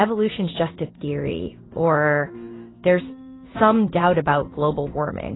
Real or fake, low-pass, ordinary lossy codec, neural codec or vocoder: fake; 7.2 kHz; AAC, 16 kbps; codec, 16 kHz in and 24 kHz out, 1 kbps, XY-Tokenizer